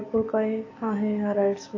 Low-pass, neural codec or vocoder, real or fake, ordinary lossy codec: 7.2 kHz; none; real; AAC, 32 kbps